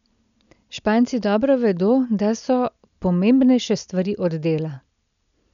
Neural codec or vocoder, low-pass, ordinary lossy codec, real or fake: none; 7.2 kHz; none; real